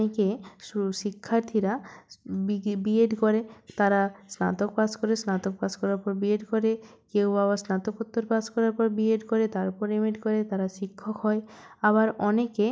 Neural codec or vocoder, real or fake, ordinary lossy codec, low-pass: none; real; none; none